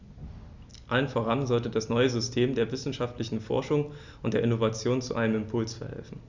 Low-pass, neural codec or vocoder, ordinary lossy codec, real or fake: 7.2 kHz; none; Opus, 64 kbps; real